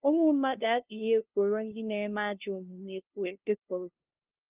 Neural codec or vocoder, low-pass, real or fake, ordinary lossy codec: codec, 16 kHz, 0.5 kbps, FunCodec, trained on LibriTTS, 25 frames a second; 3.6 kHz; fake; Opus, 32 kbps